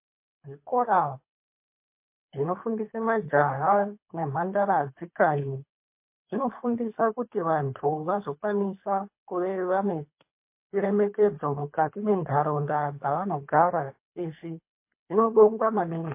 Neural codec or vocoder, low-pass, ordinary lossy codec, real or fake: codec, 24 kHz, 3 kbps, HILCodec; 3.6 kHz; MP3, 24 kbps; fake